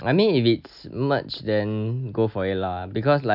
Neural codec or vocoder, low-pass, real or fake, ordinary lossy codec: none; 5.4 kHz; real; none